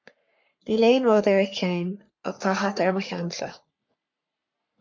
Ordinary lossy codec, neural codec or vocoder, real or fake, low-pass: MP3, 64 kbps; codec, 44.1 kHz, 3.4 kbps, Pupu-Codec; fake; 7.2 kHz